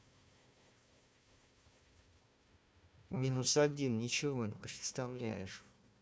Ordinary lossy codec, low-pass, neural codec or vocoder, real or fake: none; none; codec, 16 kHz, 1 kbps, FunCodec, trained on Chinese and English, 50 frames a second; fake